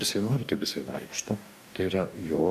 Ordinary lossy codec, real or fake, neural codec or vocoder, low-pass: MP3, 96 kbps; fake; codec, 44.1 kHz, 2.6 kbps, DAC; 14.4 kHz